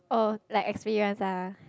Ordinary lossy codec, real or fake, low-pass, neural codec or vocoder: none; real; none; none